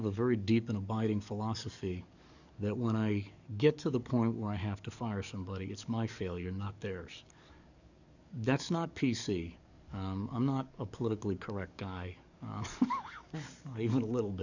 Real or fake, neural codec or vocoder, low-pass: fake; codec, 44.1 kHz, 7.8 kbps, DAC; 7.2 kHz